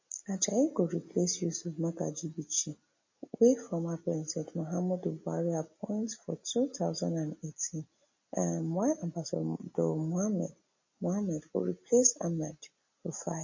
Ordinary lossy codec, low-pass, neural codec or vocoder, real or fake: MP3, 32 kbps; 7.2 kHz; none; real